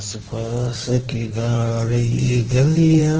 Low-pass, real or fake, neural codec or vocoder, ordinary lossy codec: 7.2 kHz; fake; codec, 16 kHz in and 24 kHz out, 1.1 kbps, FireRedTTS-2 codec; Opus, 16 kbps